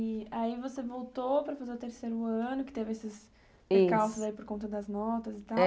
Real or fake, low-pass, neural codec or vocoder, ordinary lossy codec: real; none; none; none